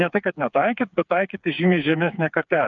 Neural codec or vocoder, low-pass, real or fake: codec, 16 kHz, 4 kbps, FreqCodec, smaller model; 7.2 kHz; fake